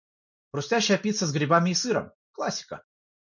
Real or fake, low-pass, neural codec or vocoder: real; 7.2 kHz; none